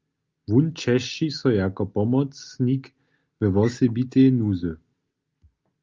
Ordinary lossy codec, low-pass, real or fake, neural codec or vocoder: Opus, 24 kbps; 7.2 kHz; real; none